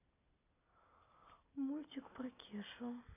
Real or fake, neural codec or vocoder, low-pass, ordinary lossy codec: real; none; 3.6 kHz; none